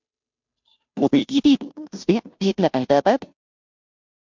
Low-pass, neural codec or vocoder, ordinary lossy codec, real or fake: 7.2 kHz; codec, 16 kHz, 0.5 kbps, FunCodec, trained on Chinese and English, 25 frames a second; MP3, 64 kbps; fake